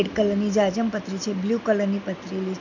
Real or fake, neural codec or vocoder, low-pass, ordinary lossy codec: real; none; 7.2 kHz; none